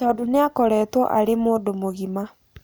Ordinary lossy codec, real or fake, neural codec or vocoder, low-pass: none; real; none; none